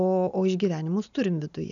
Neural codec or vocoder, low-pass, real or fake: none; 7.2 kHz; real